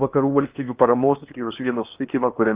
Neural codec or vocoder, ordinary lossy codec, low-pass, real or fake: codec, 16 kHz in and 24 kHz out, 0.8 kbps, FocalCodec, streaming, 65536 codes; Opus, 24 kbps; 3.6 kHz; fake